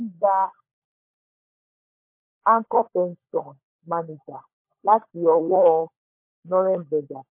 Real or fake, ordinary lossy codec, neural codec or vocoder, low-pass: fake; MP3, 24 kbps; codec, 16 kHz, 16 kbps, FunCodec, trained on LibriTTS, 50 frames a second; 3.6 kHz